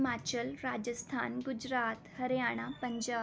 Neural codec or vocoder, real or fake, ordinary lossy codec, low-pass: none; real; none; none